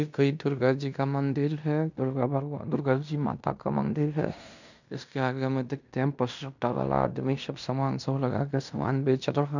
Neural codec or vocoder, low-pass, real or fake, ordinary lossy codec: codec, 16 kHz in and 24 kHz out, 0.9 kbps, LongCat-Audio-Codec, fine tuned four codebook decoder; 7.2 kHz; fake; none